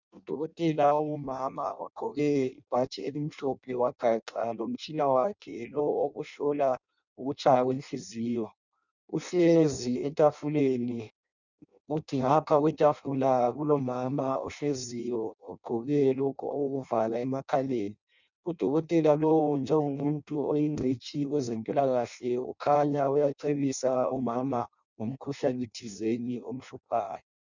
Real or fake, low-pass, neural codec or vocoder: fake; 7.2 kHz; codec, 16 kHz in and 24 kHz out, 0.6 kbps, FireRedTTS-2 codec